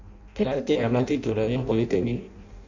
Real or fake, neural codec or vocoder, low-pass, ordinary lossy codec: fake; codec, 16 kHz in and 24 kHz out, 0.6 kbps, FireRedTTS-2 codec; 7.2 kHz; none